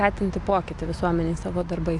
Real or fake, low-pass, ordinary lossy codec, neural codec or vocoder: real; 10.8 kHz; AAC, 64 kbps; none